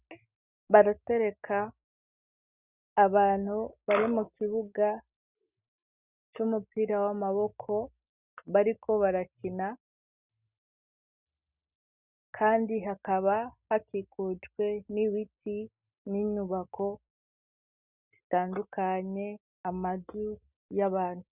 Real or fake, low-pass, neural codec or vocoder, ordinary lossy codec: real; 3.6 kHz; none; Opus, 64 kbps